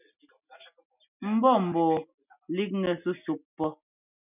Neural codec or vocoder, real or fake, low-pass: none; real; 3.6 kHz